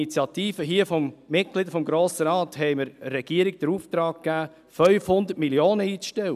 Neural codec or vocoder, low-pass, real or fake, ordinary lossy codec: none; 14.4 kHz; real; none